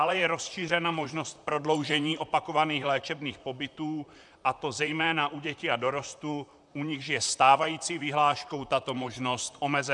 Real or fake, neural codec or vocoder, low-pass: fake; vocoder, 44.1 kHz, 128 mel bands, Pupu-Vocoder; 10.8 kHz